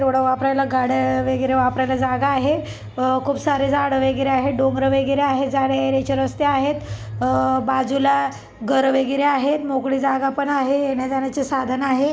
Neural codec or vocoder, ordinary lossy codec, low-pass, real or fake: none; none; none; real